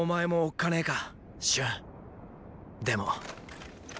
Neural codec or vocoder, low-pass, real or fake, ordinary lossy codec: none; none; real; none